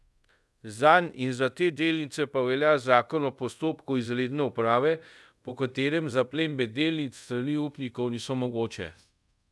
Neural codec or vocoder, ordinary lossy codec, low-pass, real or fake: codec, 24 kHz, 0.5 kbps, DualCodec; none; none; fake